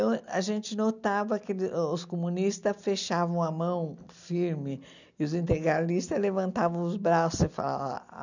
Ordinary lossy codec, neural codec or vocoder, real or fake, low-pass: none; none; real; 7.2 kHz